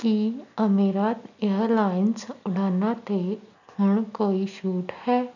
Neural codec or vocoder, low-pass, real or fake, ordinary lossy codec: none; 7.2 kHz; real; none